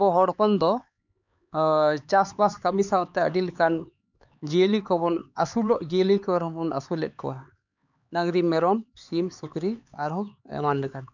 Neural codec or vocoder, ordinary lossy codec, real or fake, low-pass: codec, 16 kHz, 4 kbps, X-Codec, HuBERT features, trained on LibriSpeech; none; fake; 7.2 kHz